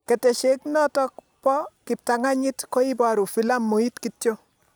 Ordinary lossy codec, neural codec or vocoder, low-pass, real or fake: none; none; none; real